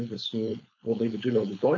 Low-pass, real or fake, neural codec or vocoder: 7.2 kHz; fake; codec, 16 kHz, 4.8 kbps, FACodec